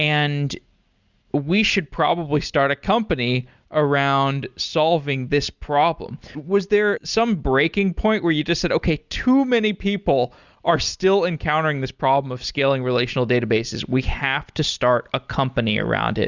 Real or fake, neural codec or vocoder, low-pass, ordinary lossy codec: real; none; 7.2 kHz; Opus, 64 kbps